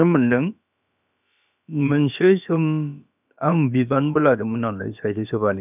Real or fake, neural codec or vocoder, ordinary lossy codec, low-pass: fake; codec, 16 kHz, about 1 kbps, DyCAST, with the encoder's durations; none; 3.6 kHz